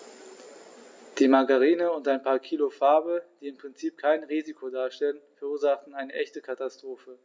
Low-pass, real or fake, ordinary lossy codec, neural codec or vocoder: none; real; none; none